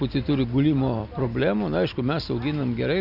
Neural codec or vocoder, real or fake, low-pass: none; real; 5.4 kHz